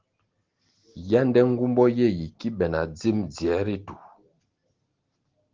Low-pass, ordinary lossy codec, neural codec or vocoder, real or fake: 7.2 kHz; Opus, 16 kbps; none; real